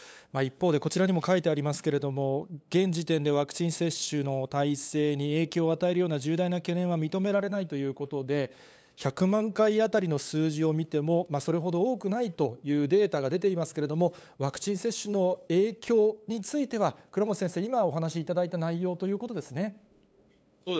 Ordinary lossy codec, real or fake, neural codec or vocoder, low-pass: none; fake; codec, 16 kHz, 8 kbps, FunCodec, trained on LibriTTS, 25 frames a second; none